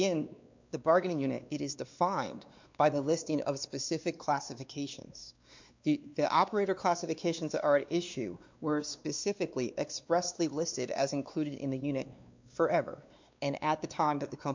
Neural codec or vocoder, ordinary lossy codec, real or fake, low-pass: codec, 16 kHz, 2 kbps, X-Codec, WavLM features, trained on Multilingual LibriSpeech; MP3, 64 kbps; fake; 7.2 kHz